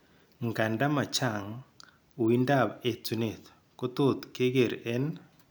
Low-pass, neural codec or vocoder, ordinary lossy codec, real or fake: none; none; none; real